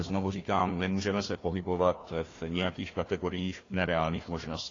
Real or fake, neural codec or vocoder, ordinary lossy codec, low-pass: fake; codec, 16 kHz, 1 kbps, FunCodec, trained on Chinese and English, 50 frames a second; AAC, 32 kbps; 7.2 kHz